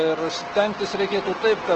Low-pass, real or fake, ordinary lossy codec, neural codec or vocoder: 7.2 kHz; real; Opus, 16 kbps; none